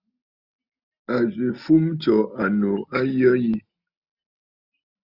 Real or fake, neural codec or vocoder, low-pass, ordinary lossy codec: real; none; 5.4 kHz; Opus, 64 kbps